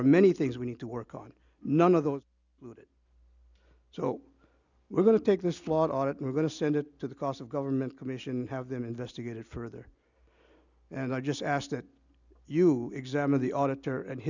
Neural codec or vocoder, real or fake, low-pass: none; real; 7.2 kHz